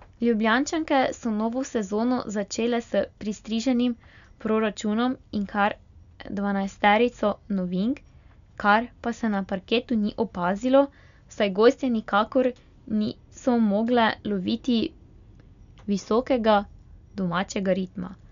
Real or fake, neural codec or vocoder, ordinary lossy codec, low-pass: real; none; none; 7.2 kHz